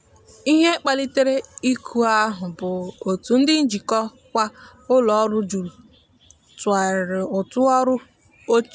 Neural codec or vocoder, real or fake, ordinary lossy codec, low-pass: none; real; none; none